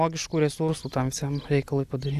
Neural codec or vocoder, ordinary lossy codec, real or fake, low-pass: none; AAC, 96 kbps; real; 14.4 kHz